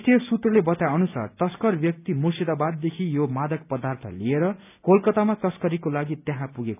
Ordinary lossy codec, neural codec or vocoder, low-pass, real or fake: none; none; 3.6 kHz; real